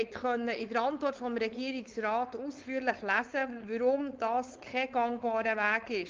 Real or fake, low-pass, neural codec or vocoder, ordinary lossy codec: fake; 7.2 kHz; codec, 16 kHz, 4.8 kbps, FACodec; Opus, 32 kbps